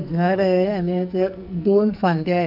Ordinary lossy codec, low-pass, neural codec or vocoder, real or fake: AAC, 48 kbps; 5.4 kHz; codec, 44.1 kHz, 2.6 kbps, SNAC; fake